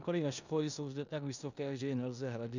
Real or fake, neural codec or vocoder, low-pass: fake; codec, 16 kHz in and 24 kHz out, 0.9 kbps, LongCat-Audio-Codec, four codebook decoder; 7.2 kHz